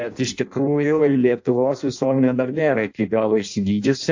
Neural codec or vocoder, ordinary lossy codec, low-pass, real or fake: codec, 16 kHz in and 24 kHz out, 0.6 kbps, FireRedTTS-2 codec; AAC, 48 kbps; 7.2 kHz; fake